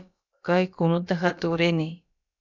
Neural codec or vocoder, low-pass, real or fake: codec, 16 kHz, about 1 kbps, DyCAST, with the encoder's durations; 7.2 kHz; fake